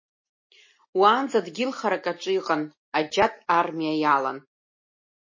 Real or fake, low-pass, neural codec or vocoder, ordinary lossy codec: real; 7.2 kHz; none; MP3, 32 kbps